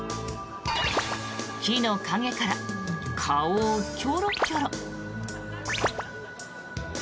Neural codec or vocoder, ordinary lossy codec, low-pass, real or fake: none; none; none; real